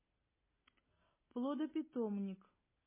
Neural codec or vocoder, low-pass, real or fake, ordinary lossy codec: none; 3.6 kHz; real; MP3, 16 kbps